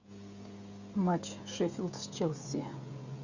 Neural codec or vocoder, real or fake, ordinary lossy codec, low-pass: codec, 16 kHz, 16 kbps, FreqCodec, smaller model; fake; Opus, 64 kbps; 7.2 kHz